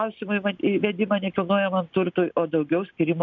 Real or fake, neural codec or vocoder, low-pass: real; none; 7.2 kHz